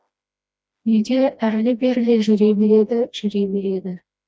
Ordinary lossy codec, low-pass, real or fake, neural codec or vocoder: none; none; fake; codec, 16 kHz, 1 kbps, FreqCodec, smaller model